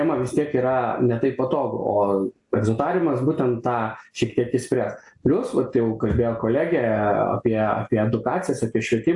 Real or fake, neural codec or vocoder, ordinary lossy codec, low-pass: real; none; AAC, 64 kbps; 10.8 kHz